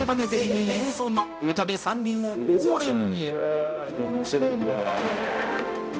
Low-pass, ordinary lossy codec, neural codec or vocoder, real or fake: none; none; codec, 16 kHz, 0.5 kbps, X-Codec, HuBERT features, trained on balanced general audio; fake